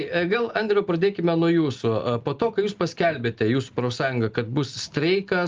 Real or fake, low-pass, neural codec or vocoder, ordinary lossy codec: real; 7.2 kHz; none; Opus, 32 kbps